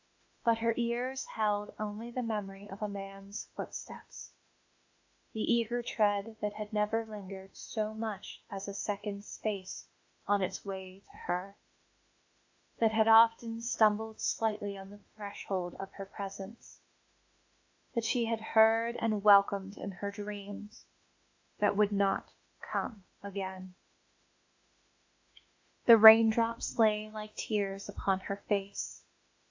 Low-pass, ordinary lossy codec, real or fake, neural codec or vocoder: 7.2 kHz; AAC, 48 kbps; fake; autoencoder, 48 kHz, 32 numbers a frame, DAC-VAE, trained on Japanese speech